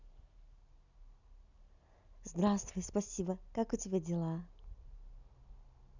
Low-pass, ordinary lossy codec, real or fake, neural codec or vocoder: 7.2 kHz; none; real; none